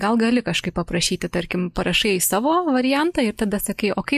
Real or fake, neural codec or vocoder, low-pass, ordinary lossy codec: real; none; 14.4 kHz; MP3, 64 kbps